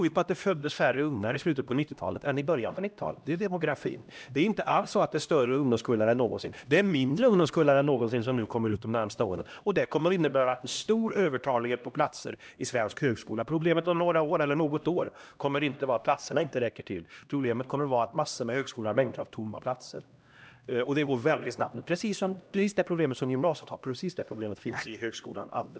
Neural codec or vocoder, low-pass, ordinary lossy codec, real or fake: codec, 16 kHz, 1 kbps, X-Codec, HuBERT features, trained on LibriSpeech; none; none; fake